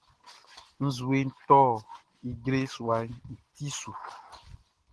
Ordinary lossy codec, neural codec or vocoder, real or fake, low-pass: Opus, 16 kbps; none; real; 10.8 kHz